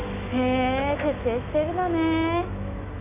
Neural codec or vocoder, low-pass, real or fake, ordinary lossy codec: autoencoder, 48 kHz, 128 numbers a frame, DAC-VAE, trained on Japanese speech; 3.6 kHz; fake; none